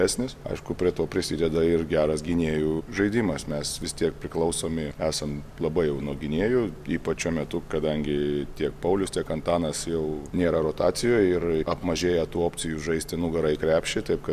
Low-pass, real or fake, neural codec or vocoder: 14.4 kHz; real; none